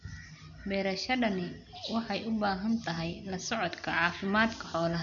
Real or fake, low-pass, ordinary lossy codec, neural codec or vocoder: real; 7.2 kHz; none; none